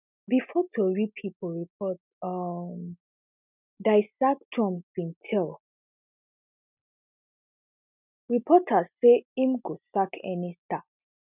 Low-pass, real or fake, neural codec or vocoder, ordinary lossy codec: 3.6 kHz; real; none; none